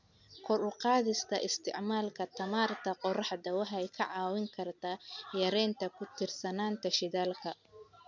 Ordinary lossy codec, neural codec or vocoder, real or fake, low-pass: none; none; real; 7.2 kHz